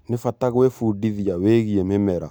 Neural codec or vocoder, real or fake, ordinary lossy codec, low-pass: none; real; none; none